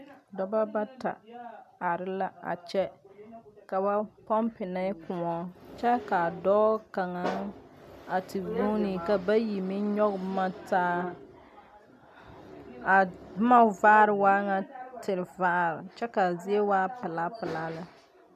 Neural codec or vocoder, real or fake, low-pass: none; real; 14.4 kHz